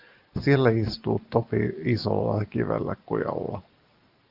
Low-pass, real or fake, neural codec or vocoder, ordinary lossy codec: 5.4 kHz; real; none; Opus, 32 kbps